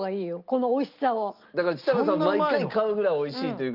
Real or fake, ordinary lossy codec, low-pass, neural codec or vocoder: real; Opus, 32 kbps; 5.4 kHz; none